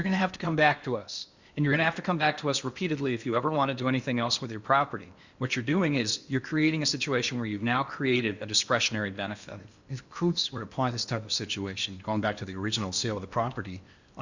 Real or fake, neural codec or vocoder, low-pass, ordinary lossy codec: fake; codec, 16 kHz in and 24 kHz out, 0.8 kbps, FocalCodec, streaming, 65536 codes; 7.2 kHz; Opus, 64 kbps